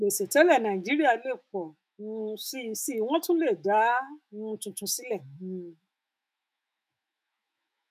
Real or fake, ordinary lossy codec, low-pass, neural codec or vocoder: fake; none; 14.4 kHz; autoencoder, 48 kHz, 128 numbers a frame, DAC-VAE, trained on Japanese speech